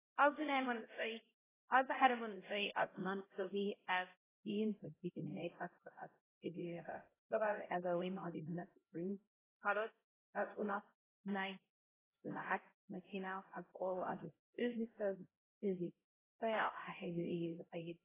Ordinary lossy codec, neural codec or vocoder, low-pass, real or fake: AAC, 16 kbps; codec, 16 kHz, 0.5 kbps, X-Codec, HuBERT features, trained on LibriSpeech; 3.6 kHz; fake